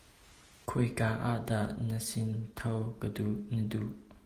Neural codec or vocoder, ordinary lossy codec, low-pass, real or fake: vocoder, 48 kHz, 128 mel bands, Vocos; Opus, 24 kbps; 14.4 kHz; fake